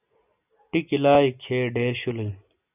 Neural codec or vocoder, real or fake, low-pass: none; real; 3.6 kHz